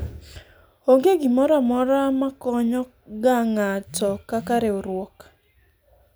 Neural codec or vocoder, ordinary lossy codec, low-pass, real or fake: none; none; none; real